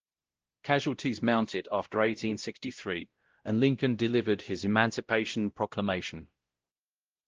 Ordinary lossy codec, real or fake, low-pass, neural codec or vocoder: Opus, 16 kbps; fake; 7.2 kHz; codec, 16 kHz, 0.5 kbps, X-Codec, WavLM features, trained on Multilingual LibriSpeech